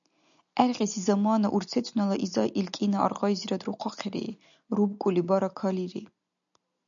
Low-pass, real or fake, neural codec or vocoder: 7.2 kHz; real; none